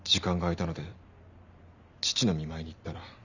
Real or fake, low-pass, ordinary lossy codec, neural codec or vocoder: real; 7.2 kHz; none; none